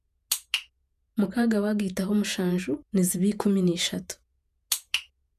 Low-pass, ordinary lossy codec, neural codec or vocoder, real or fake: 14.4 kHz; none; vocoder, 48 kHz, 128 mel bands, Vocos; fake